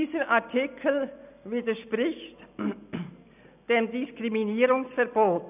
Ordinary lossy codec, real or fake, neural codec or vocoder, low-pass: none; real; none; 3.6 kHz